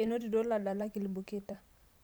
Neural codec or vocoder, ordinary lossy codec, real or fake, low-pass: vocoder, 44.1 kHz, 128 mel bands every 512 samples, BigVGAN v2; none; fake; none